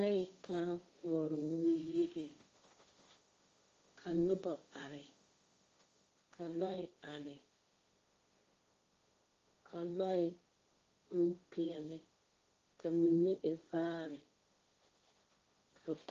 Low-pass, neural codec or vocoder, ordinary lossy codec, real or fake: 7.2 kHz; codec, 16 kHz, 1.1 kbps, Voila-Tokenizer; Opus, 32 kbps; fake